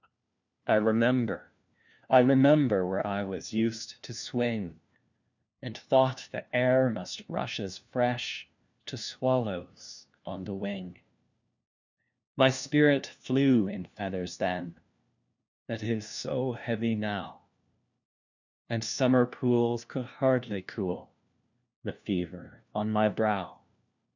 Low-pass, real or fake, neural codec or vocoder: 7.2 kHz; fake; codec, 16 kHz, 1 kbps, FunCodec, trained on LibriTTS, 50 frames a second